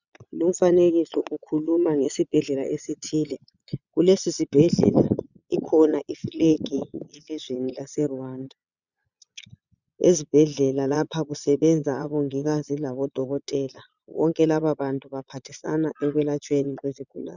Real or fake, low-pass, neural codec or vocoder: fake; 7.2 kHz; vocoder, 22.05 kHz, 80 mel bands, Vocos